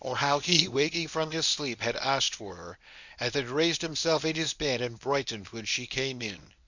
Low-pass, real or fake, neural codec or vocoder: 7.2 kHz; fake; codec, 24 kHz, 0.9 kbps, WavTokenizer, medium speech release version 1